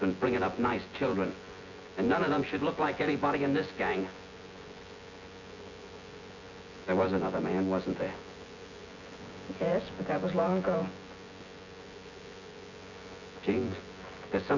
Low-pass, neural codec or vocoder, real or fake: 7.2 kHz; vocoder, 24 kHz, 100 mel bands, Vocos; fake